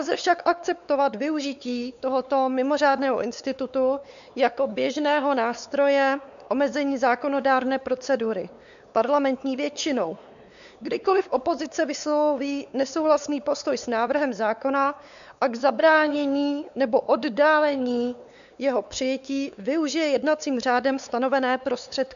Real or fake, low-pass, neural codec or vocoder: fake; 7.2 kHz; codec, 16 kHz, 4 kbps, X-Codec, WavLM features, trained on Multilingual LibriSpeech